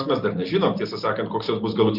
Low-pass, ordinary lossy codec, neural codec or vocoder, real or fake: 5.4 kHz; Opus, 64 kbps; none; real